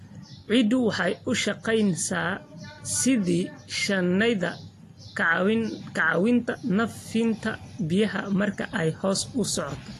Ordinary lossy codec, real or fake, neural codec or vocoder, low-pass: AAC, 48 kbps; real; none; 14.4 kHz